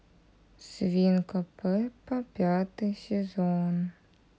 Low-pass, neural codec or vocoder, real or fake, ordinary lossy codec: none; none; real; none